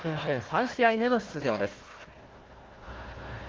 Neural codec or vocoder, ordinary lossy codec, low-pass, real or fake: codec, 16 kHz, 1 kbps, FunCodec, trained on Chinese and English, 50 frames a second; Opus, 16 kbps; 7.2 kHz; fake